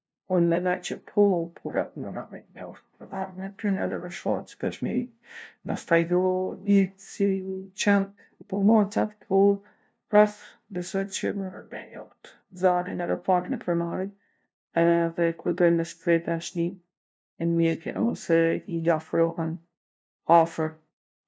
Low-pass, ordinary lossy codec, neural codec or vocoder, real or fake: none; none; codec, 16 kHz, 0.5 kbps, FunCodec, trained on LibriTTS, 25 frames a second; fake